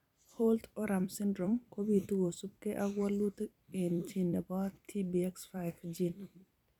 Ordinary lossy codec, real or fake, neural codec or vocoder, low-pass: none; fake; vocoder, 44.1 kHz, 128 mel bands every 256 samples, BigVGAN v2; 19.8 kHz